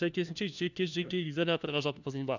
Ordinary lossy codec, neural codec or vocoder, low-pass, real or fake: none; codec, 16 kHz, 1 kbps, FunCodec, trained on LibriTTS, 50 frames a second; 7.2 kHz; fake